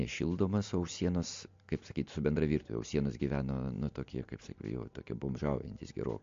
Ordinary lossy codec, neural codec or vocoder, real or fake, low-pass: MP3, 48 kbps; none; real; 7.2 kHz